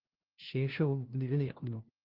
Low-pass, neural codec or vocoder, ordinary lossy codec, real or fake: 5.4 kHz; codec, 16 kHz, 0.5 kbps, FunCodec, trained on LibriTTS, 25 frames a second; Opus, 16 kbps; fake